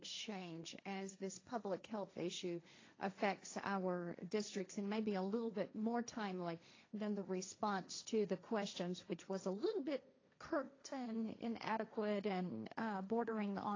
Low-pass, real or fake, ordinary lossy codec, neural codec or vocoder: 7.2 kHz; fake; AAC, 32 kbps; codec, 16 kHz, 1.1 kbps, Voila-Tokenizer